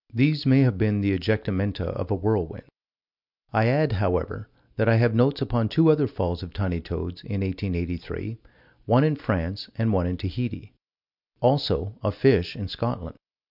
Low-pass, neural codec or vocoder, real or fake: 5.4 kHz; none; real